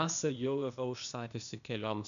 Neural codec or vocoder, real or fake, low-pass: codec, 16 kHz, 0.8 kbps, ZipCodec; fake; 7.2 kHz